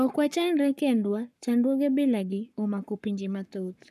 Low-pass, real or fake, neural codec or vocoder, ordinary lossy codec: 14.4 kHz; fake; codec, 44.1 kHz, 7.8 kbps, Pupu-Codec; none